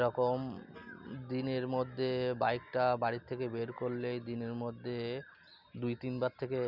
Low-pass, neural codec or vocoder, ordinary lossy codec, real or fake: 5.4 kHz; none; none; real